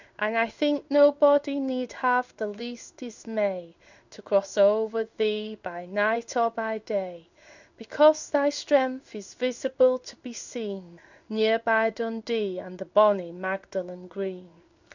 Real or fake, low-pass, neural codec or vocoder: fake; 7.2 kHz; codec, 16 kHz in and 24 kHz out, 1 kbps, XY-Tokenizer